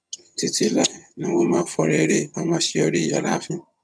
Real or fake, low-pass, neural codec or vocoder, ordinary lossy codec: fake; none; vocoder, 22.05 kHz, 80 mel bands, HiFi-GAN; none